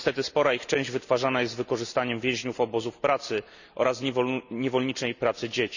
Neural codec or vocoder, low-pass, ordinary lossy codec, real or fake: none; 7.2 kHz; none; real